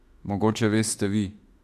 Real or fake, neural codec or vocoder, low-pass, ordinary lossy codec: fake; autoencoder, 48 kHz, 32 numbers a frame, DAC-VAE, trained on Japanese speech; 14.4 kHz; MP3, 64 kbps